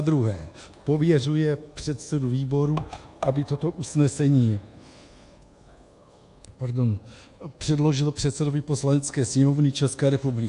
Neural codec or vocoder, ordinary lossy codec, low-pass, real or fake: codec, 24 kHz, 1.2 kbps, DualCodec; AAC, 64 kbps; 10.8 kHz; fake